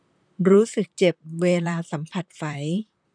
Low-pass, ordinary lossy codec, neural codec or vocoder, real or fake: 9.9 kHz; none; vocoder, 44.1 kHz, 128 mel bands, Pupu-Vocoder; fake